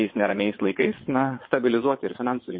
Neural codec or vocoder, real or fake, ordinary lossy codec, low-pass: vocoder, 22.05 kHz, 80 mel bands, WaveNeXt; fake; MP3, 24 kbps; 7.2 kHz